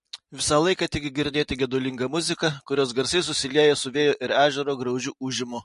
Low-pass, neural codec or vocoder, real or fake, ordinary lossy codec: 14.4 kHz; none; real; MP3, 48 kbps